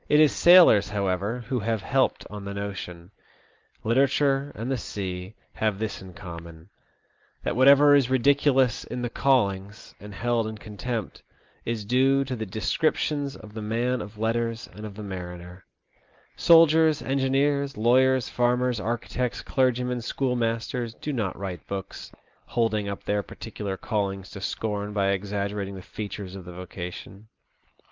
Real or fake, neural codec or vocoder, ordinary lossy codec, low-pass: real; none; Opus, 24 kbps; 7.2 kHz